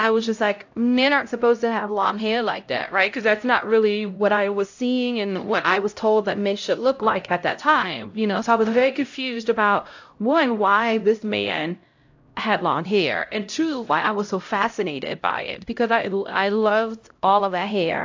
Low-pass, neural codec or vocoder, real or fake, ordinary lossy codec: 7.2 kHz; codec, 16 kHz, 0.5 kbps, X-Codec, HuBERT features, trained on LibriSpeech; fake; AAC, 48 kbps